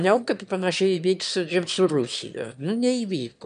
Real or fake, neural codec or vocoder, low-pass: fake; autoencoder, 22.05 kHz, a latent of 192 numbers a frame, VITS, trained on one speaker; 9.9 kHz